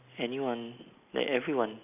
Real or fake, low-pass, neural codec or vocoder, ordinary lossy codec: real; 3.6 kHz; none; none